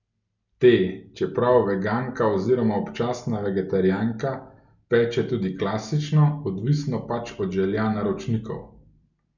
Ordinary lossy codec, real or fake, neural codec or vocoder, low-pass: none; real; none; 7.2 kHz